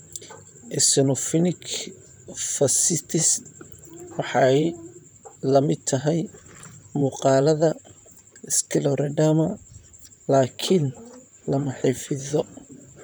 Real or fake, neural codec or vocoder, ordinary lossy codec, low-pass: fake; vocoder, 44.1 kHz, 128 mel bands, Pupu-Vocoder; none; none